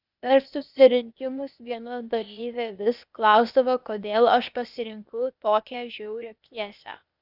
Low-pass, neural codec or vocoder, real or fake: 5.4 kHz; codec, 16 kHz, 0.8 kbps, ZipCodec; fake